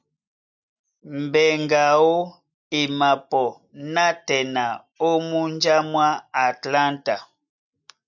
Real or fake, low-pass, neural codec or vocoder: real; 7.2 kHz; none